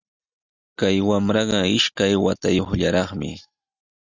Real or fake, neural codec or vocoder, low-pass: real; none; 7.2 kHz